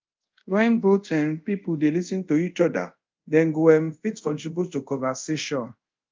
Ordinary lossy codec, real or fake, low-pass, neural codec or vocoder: Opus, 24 kbps; fake; 7.2 kHz; codec, 24 kHz, 0.5 kbps, DualCodec